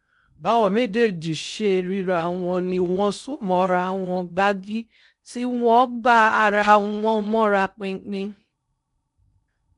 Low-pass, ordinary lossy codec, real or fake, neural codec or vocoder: 10.8 kHz; none; fake; codec, 16 kHz in and 24 kHz out, 0.6 kbps, FocalCodec, streaming, 2048 codes